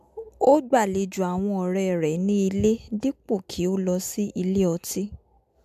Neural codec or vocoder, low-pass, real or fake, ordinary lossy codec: none; 14.4 kHz; real; MP3, 96 kbps